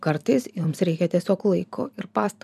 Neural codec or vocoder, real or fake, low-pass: vocoder, 44.1 kHz, 128 mel bands every 256 samples, BigVGAN v2; fake; 14.4 kHz